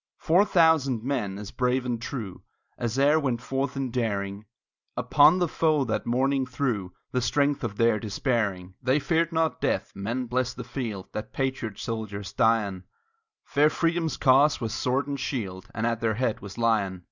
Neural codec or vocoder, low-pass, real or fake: none; 7.2 kHz; real